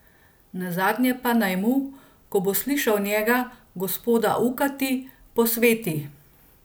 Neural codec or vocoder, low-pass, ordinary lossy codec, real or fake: none; none; none; real